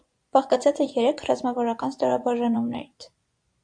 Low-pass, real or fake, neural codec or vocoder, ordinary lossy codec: 9.9 kHz; fake; vocoder, 24 kHz, 100 mel bands, Vocos; AAC, 64 kbps